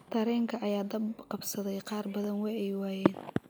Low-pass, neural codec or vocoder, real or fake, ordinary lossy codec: none; none; real; none